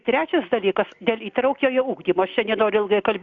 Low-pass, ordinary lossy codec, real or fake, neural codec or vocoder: 7.2 kHz; Opus, 64 kbps; real; none